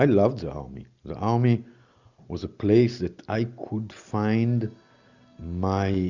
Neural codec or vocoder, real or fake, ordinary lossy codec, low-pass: none; real; Opus, 64 kbps; 7.2 kHz